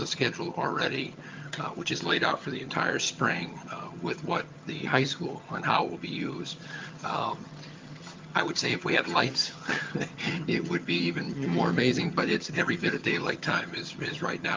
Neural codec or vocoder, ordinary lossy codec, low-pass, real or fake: vocoder, 22.05 kHz, 80 mel bands, HiFi-GAN; Opus, 24 kbps; 7.2 kHz; fake